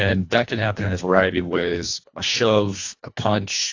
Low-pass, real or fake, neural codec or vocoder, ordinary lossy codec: 7.2 kHz; fake; codec, 24 kHz, 1.5 kbps, HILCodec; AAC, 48 kbps